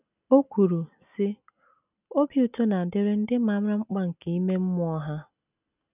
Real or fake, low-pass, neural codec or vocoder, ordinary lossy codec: real; 3.6 kHz; none; none